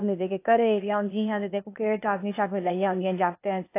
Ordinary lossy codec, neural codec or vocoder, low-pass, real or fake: AAC, 24 kbps; codec, 16 kHz, 0.8 kbps, ZipCodec; 3.6 kHz; fake